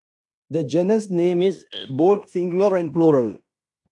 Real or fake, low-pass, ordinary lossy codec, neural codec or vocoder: fake; 10.8 kHz; AAC, 64 kbps; codec, 16 kHz in and 24 kHz out, 0.9 kbps, LongCat-Audio-Codec, fine tuned four codebook decoder